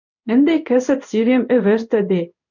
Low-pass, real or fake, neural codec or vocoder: 7.2 kHz; fake; codec, 16 kHz in and 24 kHz out, 1 kbps, XY-Tokenizer